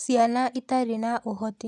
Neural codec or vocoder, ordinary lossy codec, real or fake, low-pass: none; none; real; 10.8 kHz